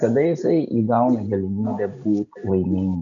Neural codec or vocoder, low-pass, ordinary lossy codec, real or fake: none; 7.2 kHz; MP3, 64 kbps; real